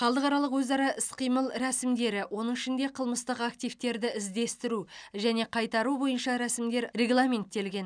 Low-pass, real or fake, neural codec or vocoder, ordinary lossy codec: 9.9 kHz; real; none; none